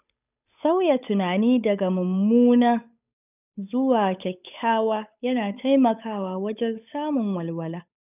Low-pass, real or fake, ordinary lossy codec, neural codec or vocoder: 3.6 kHz; fake; none; codec, 16 kHz, 8 kbps, FunCodec, trained on Chinese and English, 25 frames a second